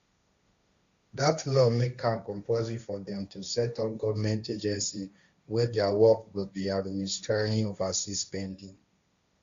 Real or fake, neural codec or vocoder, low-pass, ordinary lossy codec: fake; codec, 16 kHz, 1.1 kbps, Voila-Tokenizer; 7.2 kHz; Opus, 64 kbps